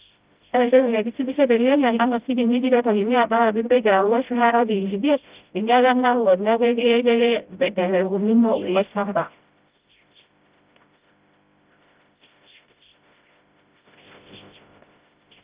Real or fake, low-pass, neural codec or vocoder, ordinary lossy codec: fake; 3.6 kHz; codec, 16 kHz, 0.5 kbps, FreqCodec, smaller model; Opus, 32 kbps